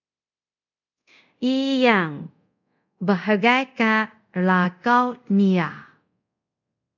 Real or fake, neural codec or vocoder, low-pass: fake; codec, 24 kHz, 0.5 kbps, DualCodec; 7.2 kHz